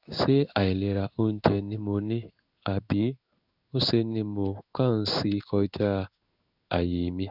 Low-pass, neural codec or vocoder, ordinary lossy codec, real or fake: 5.4 kHz; codec, 16 kHz in and 24 kHz out, 1 kbps, XY-Tokenizer; none; fake